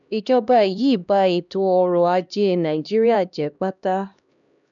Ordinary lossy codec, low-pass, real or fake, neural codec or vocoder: none; 7.2 kHz; fake; codec, 16 kHz, 1 kbps, X-Codec, HuBERT features, trained on LibriSpeech